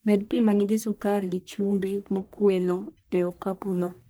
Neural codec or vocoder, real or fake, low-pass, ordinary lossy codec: codec, 44.1 kHz, 1.7 kbps, Pupu-Codec; fake; none; none